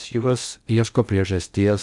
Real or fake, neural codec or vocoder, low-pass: fake; codec, 16 kHz in and 24 kHz out, 0.6 kbps, FocalCodec, streaming, 2048 codes; 10.8 kHz